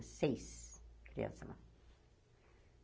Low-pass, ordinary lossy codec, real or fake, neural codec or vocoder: none; none; real; none